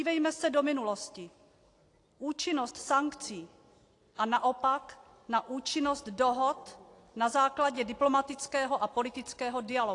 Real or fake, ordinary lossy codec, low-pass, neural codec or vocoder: real; AAC, 48 kbps; 10.8 kHz; none